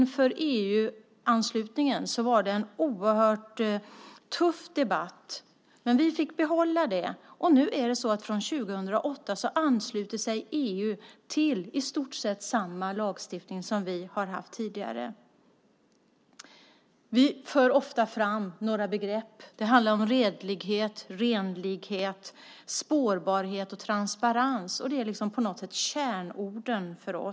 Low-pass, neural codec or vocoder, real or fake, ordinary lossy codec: none; none; real; none